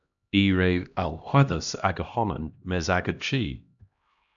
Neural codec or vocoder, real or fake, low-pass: codec, 16 kHz, 1 kbps, X-Codec, HuBERT features, trained on LibriSpeech; fake; 7.2 kHz